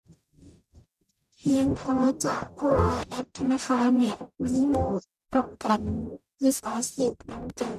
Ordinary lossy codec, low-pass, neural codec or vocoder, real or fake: none; 14.4 kHz; codec, 44.1 kHz, 0.9 kbps, DAC; fake